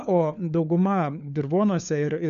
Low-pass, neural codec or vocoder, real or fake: 7.2 kHz; codec, 16 kHz, 4 kbps, FunCodec, trained on LibriTTS, 50 frames a second; fake